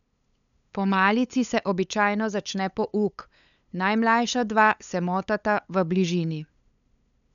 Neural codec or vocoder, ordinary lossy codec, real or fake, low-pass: codec, 16 kHz, 8 kbps, FunCodec, trained on LibriTTS, 25 frames a second; none; fake; 7.2 kHz